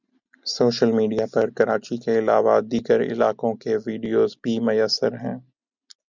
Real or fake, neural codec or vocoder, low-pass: real; none; 7.2 kHz